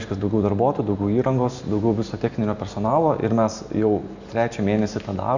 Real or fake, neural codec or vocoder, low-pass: real; none; 7.2 kHz